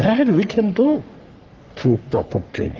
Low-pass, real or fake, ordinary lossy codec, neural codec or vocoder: 7.2 kHz; fake; Opus, 32 kbps; codec, 44.1 kHz, 3.4 kbps, Pupu-Codec